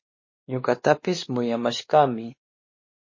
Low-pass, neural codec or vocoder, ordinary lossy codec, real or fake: 7.2 kHz; codec, 16 kHz, 2 kbps, X-Codec, WavLM features, trained on Multilingual LibriSpeech; MP3, 32 kbps; fake